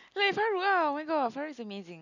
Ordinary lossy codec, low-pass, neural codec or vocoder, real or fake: none; 7.2 kHz; none; real